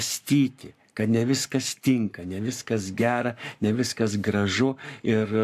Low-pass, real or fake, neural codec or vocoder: 14.4 kHz; fake; codec, 44.1 kHz, 7.8 kbps, Pupu-Codec